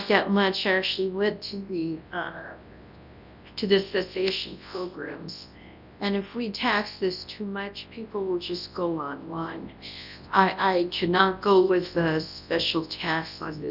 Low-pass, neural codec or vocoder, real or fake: 5.4 kHz; codec, 24 kHz, 0.9 kbps, WavTokenizer, large speech release; fake